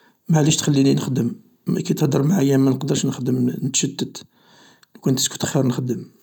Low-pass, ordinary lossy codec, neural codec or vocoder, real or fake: 19.8 kHz; none; none; real